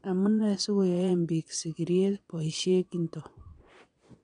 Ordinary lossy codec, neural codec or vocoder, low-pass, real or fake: none; vocoder, 22.05 kHz, 80 mel bands, Vocos; 9.9 kHz; fake